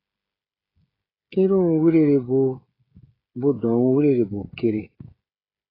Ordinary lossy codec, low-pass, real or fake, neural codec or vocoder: AAC, 24 kbps; 5.4 kHz; fake; codec, 16 kHz, 16 kbps, FreqCodec, smaller model